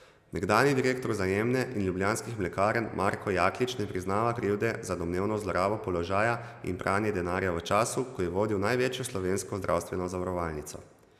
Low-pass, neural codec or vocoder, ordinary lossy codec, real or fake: 14.4 kHz; none; none; real